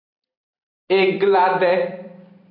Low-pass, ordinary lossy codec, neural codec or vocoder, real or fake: 5.4 kHz; AAC, 32 kbps; vocoder, 44.1 kHz, 128 mel bands every 256 samples, BigVGAN v2; fake